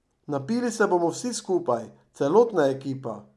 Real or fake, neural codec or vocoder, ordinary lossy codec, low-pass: real; none; none; none